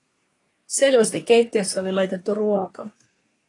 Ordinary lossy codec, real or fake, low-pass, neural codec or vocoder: AAC, 32 kbps; fake; 10.8 kHz; codec, 24 kHz, 1 kbps, SNAC